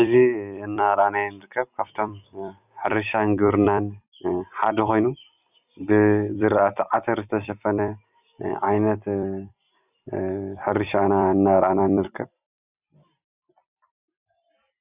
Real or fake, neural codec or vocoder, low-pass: fake; vocoder, 44.1 kHz, 128 mel bands every 256 samples, BigVGAN v2; 3.6 kHz